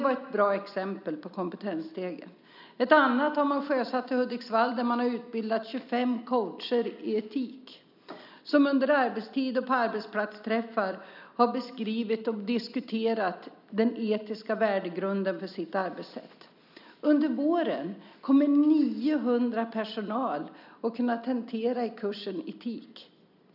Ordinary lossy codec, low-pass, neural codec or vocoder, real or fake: none; 5.4 kHz; none; real